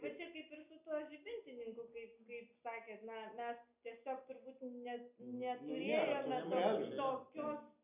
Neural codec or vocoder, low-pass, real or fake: none; 3.6 kHz; real